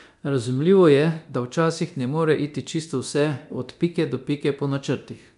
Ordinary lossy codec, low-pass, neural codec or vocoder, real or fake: none; 10.8 kHz; codec, 24 kHz, 0.9 kbps, DualCodec; fake